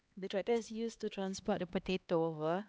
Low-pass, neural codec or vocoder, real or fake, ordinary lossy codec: none; codec, 16 kHz, 2 kbps, X-Codec, HuBERT features, trained on LibriSpeech; fake; none